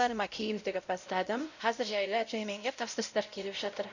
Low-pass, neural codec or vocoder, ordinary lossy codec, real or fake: 7.2 kHz; codec, 16 kHz, 0.5 kbps, X-Codec, WavLM features, trained on Multilingual LibriSpeech; none; fake